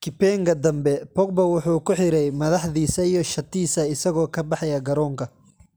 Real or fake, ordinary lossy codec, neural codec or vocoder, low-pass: real; none; none; none